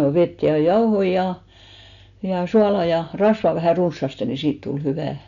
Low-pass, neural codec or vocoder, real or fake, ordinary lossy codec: 7.2 kHz; none; real; none